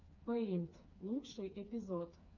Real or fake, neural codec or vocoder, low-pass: fake; codec, 16 kHz, 4 kbps, FreqCodec, smaller model; 7.2 kHz